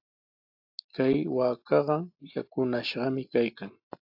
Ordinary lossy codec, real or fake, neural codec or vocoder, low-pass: AAC, 48 kbps; real; none; 5.4 kHz